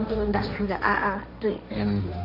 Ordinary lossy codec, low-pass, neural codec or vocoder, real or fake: none; 5.4 kHz; codec, 16 kHz, 1.1 kbps, Voila-Tokenizer; fake